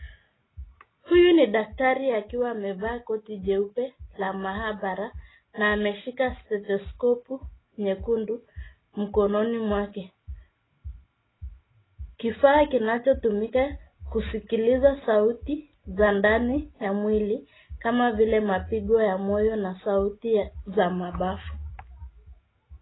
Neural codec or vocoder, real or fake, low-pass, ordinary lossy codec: none; real; 7.2 kHz; AAC, 16 kbps